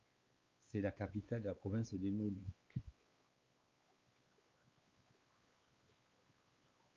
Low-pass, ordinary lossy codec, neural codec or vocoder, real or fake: 7.2 kHz; Opus, 32 kbps; codec, 16 kHz, 4 kbps, X-Codec, WavLM features, trained on Multilingual LibriSpeech; fake